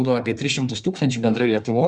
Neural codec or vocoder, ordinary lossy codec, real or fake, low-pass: codec, 24 kHz, 1 kbps, SNAC; Opus, 64 kbps; fake; 10.8 kHz